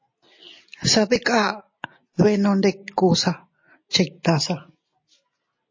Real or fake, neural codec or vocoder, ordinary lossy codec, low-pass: real; none; MP3, 32 kbps; 7.2 kHz